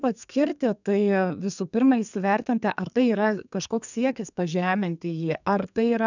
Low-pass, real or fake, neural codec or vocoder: 7.2 kHz; fake; codec, 32 kHz, 1.9 kbps, SNAC